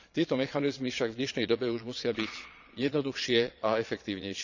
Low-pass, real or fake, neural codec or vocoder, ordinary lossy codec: 7.2 kHz; fake; vocoder, 22.05 kHz, 80 mel bands, Vocos; none